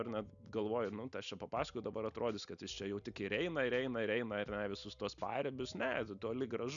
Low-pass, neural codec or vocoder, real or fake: 7.2 kHz; none; real